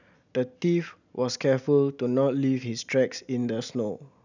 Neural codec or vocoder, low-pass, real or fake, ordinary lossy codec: none; 7.2 kHz; real; none